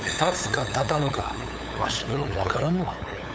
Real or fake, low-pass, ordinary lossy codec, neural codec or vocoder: fake; none; none; codec, 16 kHz, 8 kbps, FunCodec, trained on LibriTTS, 25 frames a second